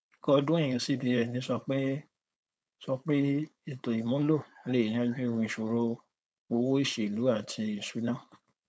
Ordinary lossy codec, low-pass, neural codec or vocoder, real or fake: none; none; codec, 16 kHz, 4.8 kbps, FACodec; fake